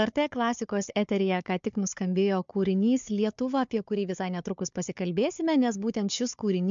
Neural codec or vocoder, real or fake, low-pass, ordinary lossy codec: codec, 16 kHz, 4 kbps, FunCodec, trained on Chinese and English, 50 frames a second; fake; 7.2 kHz; MP3, 64 kbps